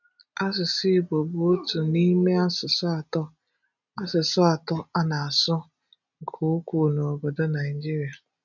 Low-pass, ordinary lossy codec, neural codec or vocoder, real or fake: 7.2 kHz; none; none; real